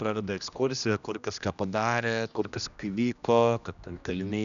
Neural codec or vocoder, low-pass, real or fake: codec, 16 kHz, 1 kbps, X-Codec, HuBERT features, trained on general audio; 7.2 kHz; fake